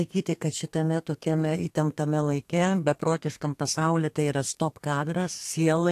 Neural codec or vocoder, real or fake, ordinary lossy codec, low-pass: codec, 32 kHz, 1.9 kbps, SNAC; fake; AAC, 64 kbps; 14.4 kHz